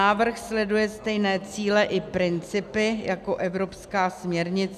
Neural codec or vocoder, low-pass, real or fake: none; 14.4 kHz; real